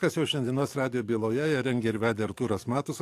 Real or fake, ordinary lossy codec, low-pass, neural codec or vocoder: fake; AAC, 64 kbps; 14.4 kHz; codec, 44.1 kHz, 7.8 kbps, DAC